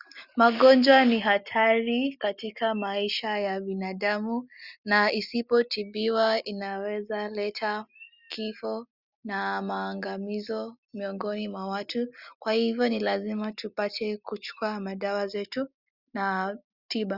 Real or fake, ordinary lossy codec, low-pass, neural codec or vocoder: real; Opus, 64 kbps; 5.4 kHz; none